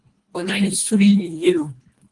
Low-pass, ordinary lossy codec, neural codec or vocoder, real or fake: 10.8 kHz; Opus, 24 kbps; codec, 24 kHz, 1.5 kbps, HILCodec; fake